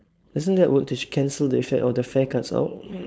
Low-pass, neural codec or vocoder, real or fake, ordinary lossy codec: none; codec, 16 kHz, 4.8 kbps, FACodec; fake; none